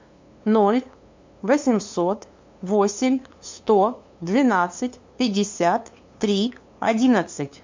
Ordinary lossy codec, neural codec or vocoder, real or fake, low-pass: MP3, 48 kbps; codec, 16 kHz, 2 kbps, FunCodec, trained on LibriTTS, 25 frames a second; fake; 7.2 kHz